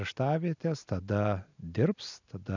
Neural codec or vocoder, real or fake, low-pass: none; real; 7.2 kHz